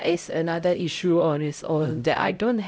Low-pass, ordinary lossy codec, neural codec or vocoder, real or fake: none; none; codec, 16 kHz, 0.5 kbps, X-Codec, HuBERT features, trained on LibriSpeech; fake